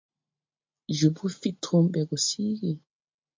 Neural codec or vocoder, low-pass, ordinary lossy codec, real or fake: none; 7.2 kHz; MP3, 48 kbps; real